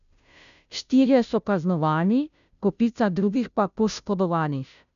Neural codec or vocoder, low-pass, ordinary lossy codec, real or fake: codec, 16 kHz, 0.5 kbps, FunCodec, trained on Chinese and English, 25 frames a second; 7.2 kHz; none; fake